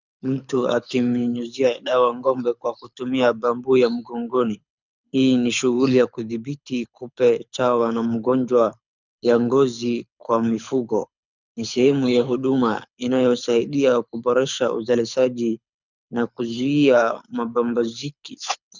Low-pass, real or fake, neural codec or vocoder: 7.2 kHz; fake; codec, 24 kHz, 6 kbps, HILCodec